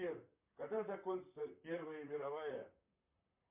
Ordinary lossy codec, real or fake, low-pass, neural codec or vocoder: MP3, 32 kbps; fake; 3.6 kHz; vocoder, 44.1 kHz, 128 mel bands, Pupu-Vocoder